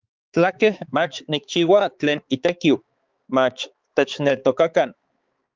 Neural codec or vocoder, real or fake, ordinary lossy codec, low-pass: codec, 16 kHz, 4 kbps, X-Codec, HuBERT features, trained on balanced general audio; fake; Opus, 32 kbps; 7.2 kHz